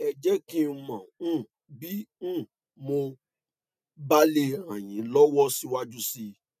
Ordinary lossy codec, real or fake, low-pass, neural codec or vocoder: none; real; 14.4 kHz; none